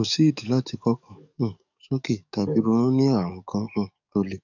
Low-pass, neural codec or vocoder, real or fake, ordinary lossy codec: 7.2 kHz; codec, 44.1 kHz, 7.8 kbps, DAC; fake; none